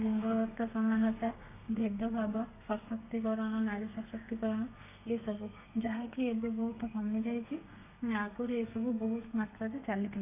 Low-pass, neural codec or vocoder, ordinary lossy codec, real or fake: 3.6 kHz; codec, 32 kHz, 1.9 kbps, SNAC; AAC, 32 kbps; fake